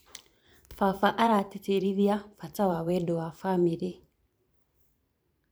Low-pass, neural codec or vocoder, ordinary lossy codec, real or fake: none; vocoder, 44.1 kHz, 128 mel bands every 512 samples, BigVGAN v2; none; fake